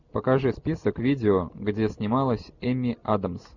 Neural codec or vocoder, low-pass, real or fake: none; 7.2 kHz; real